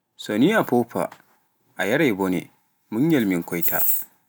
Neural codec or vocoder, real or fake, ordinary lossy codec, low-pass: none; real; none; none